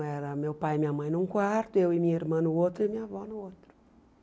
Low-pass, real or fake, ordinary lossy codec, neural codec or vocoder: none; real; none; none